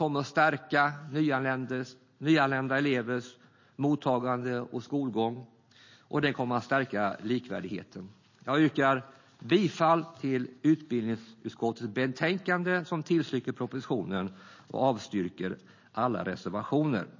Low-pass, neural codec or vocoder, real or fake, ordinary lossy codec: 7.2 kHz; none; real; MP3, 32 kbps